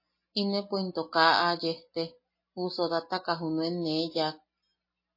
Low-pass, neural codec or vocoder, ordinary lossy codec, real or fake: 5.4 kHz; none; MP3, 24 kbps; real